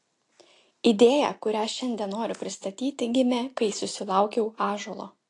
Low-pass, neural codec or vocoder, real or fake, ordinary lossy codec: 10.8 kHz; none; real; AAC, 48 kbps